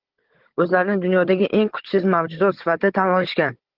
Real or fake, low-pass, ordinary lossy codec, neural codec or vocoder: fake; 5.4 kHz; Opus, 16 kbps; codec, 16 kHz, 16 kbps, FunCodec, trained on Chinese and English, 50 frames a second